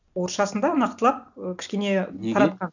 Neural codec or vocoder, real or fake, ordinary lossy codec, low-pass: none; real; none; 7.2 kHz